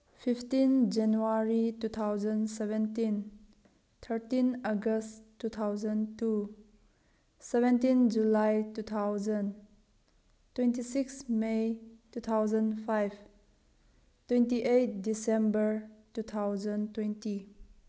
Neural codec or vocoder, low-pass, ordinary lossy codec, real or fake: none; none; none; real